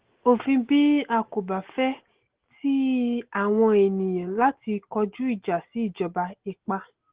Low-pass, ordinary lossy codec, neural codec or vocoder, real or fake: 3.6 kHz; Opus, 16 kbps; none; real